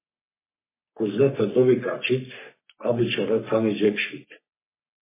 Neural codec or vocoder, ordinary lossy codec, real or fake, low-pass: codec, 44.1 kHz, 3.4 kbps, Pupu-Codec; MP3, 16 kbps; fake; 3.6 kHz